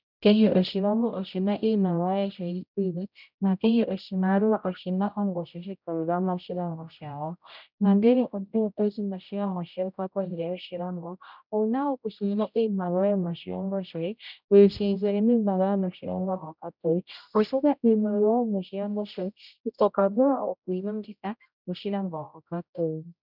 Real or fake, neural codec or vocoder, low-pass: fake; codec, 16 kHz, 0.5 kbps, X-Codec, HuBERT features, trained on general audio; 5.4 kHz